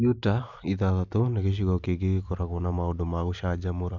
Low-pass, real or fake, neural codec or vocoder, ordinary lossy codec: 7.2 kHz; real; none; none